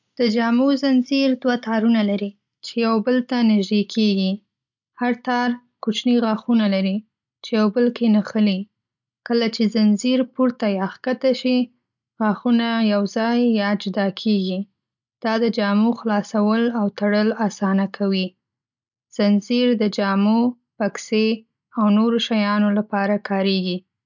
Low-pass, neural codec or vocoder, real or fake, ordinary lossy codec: 7.2 kHz; none; real; none